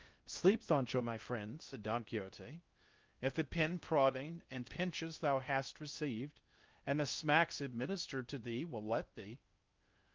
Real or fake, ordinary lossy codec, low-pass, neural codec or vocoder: fake; Opus, 24 kbps; 7.2 kHz; codec, 16 kHz in and 24 kHz out, 0.6 kbps, FocalCodec, streaming, 2048 codes